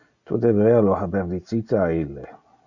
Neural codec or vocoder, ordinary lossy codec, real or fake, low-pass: none; Opus, 64 kbps; real; 7.2 kHz